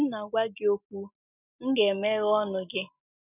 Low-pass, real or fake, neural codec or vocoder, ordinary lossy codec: 3.6 kHz; real; none; none